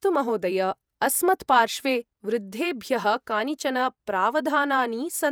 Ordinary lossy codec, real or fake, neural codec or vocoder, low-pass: none; fake; vocoder, 48 kHz, 128 mel bands, Vocos; none